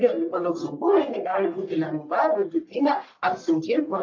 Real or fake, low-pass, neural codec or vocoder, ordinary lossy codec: fake; 7.2 kHz; codec, 44.1 kHz, 1.7 kbps, Pupu-Codec; MP3, 48 kbps